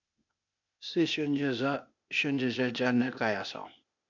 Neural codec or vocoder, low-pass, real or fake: codec, 16 kHz, 0.8 kbps, ZipCodec; 7.2 kHz; fake